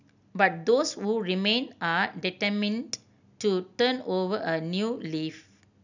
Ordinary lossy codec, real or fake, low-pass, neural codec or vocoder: none; real; 7.2 kHz; none